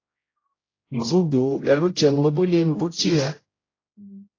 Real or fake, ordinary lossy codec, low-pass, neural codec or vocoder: fake; AAC, 32 kbps; 7.2 kHz; codec, 16 kHz, 0.5 kbps, X-Codec, HuBERT features, trained on general audio